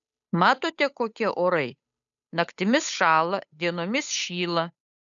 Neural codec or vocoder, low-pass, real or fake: codec, 16 kHz, 8 kbps, FunCodec, trained on Chinese and English, 25 frames a second; 7.2 kHz; fake